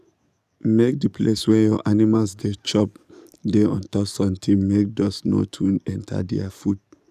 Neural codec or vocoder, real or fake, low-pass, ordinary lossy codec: codec, 44.1 kHz, 7.8 kbps, DAC; fake; 14.4 kHz; none